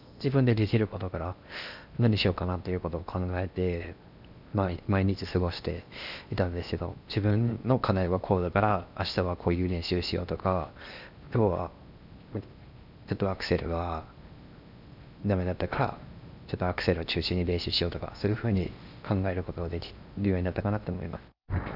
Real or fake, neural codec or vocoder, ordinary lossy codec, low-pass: fake; codec, 16 kHz in and 24 kHz out, 0.8 kbps, FocalCodec, streaming, 65536 codes; none; 5.4 kHz